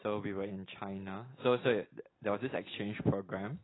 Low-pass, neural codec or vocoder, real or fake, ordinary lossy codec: 7.2 kHz; none; real; AAC, 16 kbps